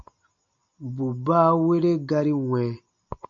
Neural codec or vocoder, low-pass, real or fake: none; 7.2 kHz; real